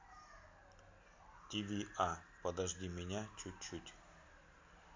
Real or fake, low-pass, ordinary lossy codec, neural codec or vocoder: real; 7.2 kHz; MP3, 48 kbps; none